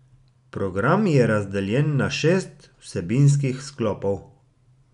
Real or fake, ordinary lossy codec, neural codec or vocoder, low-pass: real; none; none; 10.8 kHz